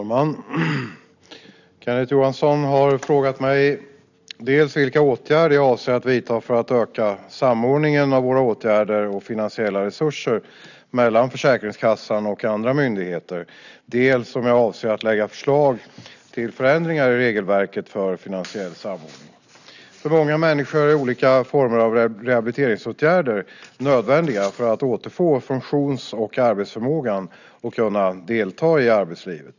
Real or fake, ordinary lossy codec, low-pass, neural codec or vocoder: real; none; 7.2 kHz; none